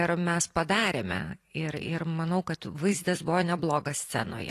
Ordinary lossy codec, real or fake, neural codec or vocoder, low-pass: AAC, 48 kbps; fake; vocoder, 44.1 kHz, 128 mel bands every 256 samples, BigVGAN v2; 14.4 kHz